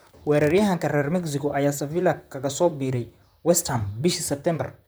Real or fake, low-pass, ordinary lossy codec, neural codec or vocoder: fake; none; none; vocoder, 44.1 kHz, 128 mel bands, Pupu-Vocoder